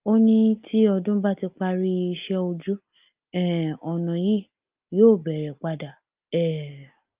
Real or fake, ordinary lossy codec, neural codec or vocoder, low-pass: real; Opus, 24 kbps; none; 3.6 kHz